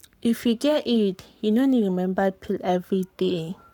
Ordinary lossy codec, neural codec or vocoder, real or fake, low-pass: none; codec, 44.1 kHz, 7.8 kbps, Pupu-Codec; fake; 19.8 kHz